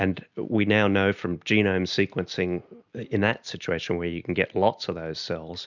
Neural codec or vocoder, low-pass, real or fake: none; 7.2 kHz; real